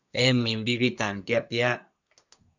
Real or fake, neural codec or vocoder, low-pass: fake; codec, 24 kHz, 1 kbps, SNAC; 7.2 kHz